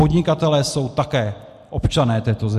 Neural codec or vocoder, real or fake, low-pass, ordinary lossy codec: none; real; 14.4 kHz; MP3, 64 kbps